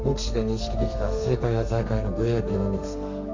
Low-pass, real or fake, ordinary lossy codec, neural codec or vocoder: 7.2 kHz; fake; MP3, 48 kbps; codec, 32 kHz, 1.9 kbps, SNAC